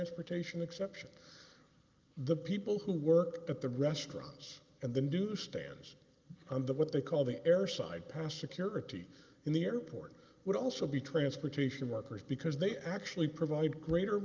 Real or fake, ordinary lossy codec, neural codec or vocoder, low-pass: real; Opus, 32 kbps; none; 7.2 kHz